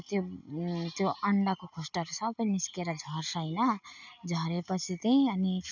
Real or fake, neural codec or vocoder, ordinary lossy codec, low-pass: real; none; none; 7.2 kHz